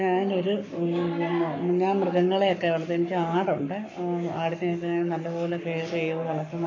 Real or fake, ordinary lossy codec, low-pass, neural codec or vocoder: fake; AAC, 48 kbps; 7.2 kHz; codec, 44.1 kHz, 7.8 kbps, Pupu-Codec